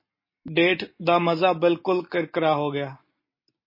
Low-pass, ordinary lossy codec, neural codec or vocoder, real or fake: 5.4 kHz; MP3, 24 kbps; none; real